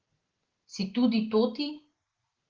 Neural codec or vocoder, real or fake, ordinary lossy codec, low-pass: none; real; Opus, 16 kbps; 7.2 kHz